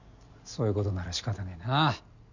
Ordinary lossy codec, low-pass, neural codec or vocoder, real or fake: none; 7.2 kHz; none; real